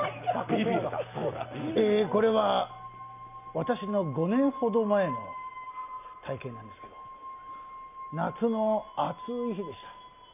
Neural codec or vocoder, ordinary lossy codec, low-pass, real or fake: none; none; 3.6 kHz; real